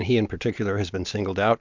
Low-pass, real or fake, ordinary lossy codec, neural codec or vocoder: 7.2 kHz; real; MP3, 64 kbps; none